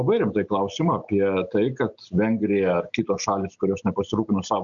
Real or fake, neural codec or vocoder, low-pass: real; none; 7.2 kHz